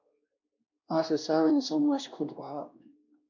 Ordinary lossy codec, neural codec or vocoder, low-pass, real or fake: MP3, 64 kbps; codec, 16 kHz, 1 kbps, X-Codec, WavLM features, trained on Multilingual LibriSpeech; 7.2 kHz; fake